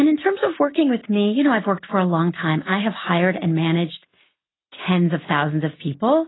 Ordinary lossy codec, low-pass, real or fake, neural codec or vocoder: AAC, 16 kbps; 7.2 kHz; real; none